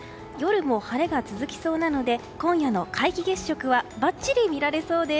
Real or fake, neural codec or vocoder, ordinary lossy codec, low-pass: real; none; none; none